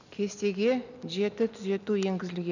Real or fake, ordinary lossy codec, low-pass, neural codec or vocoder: real; none; 7.2 kHz; none